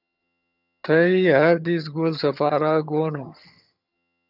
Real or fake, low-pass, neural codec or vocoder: fake; 5.4 kHz; vocoder, 22.05 kHz, 80 mel bands, HiFi-GAN